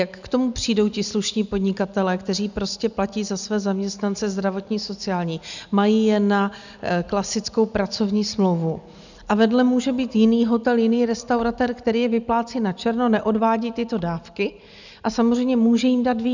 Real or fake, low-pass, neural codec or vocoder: real; 7.2 kHz; none